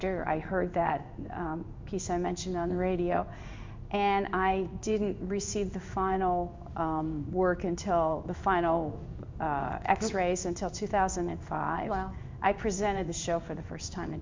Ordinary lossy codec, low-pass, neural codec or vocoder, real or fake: MP3, 64 kbps; 7.2 kHz; codec, 16 kHz in and 24 kHz out, 1 kbps, XY-Tokenizer; fake